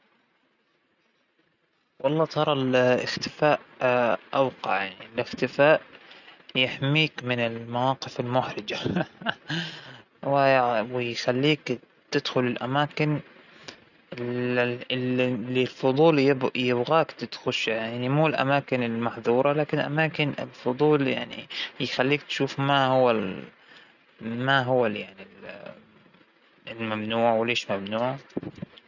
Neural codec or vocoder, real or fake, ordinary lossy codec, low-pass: none; real; none; 7.2 kHz